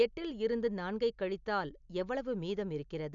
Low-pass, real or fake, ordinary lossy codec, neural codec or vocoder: 7.2 kHz; real; none; none